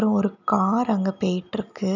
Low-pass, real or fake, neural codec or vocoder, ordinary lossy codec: 7.2 kHz; real; none; none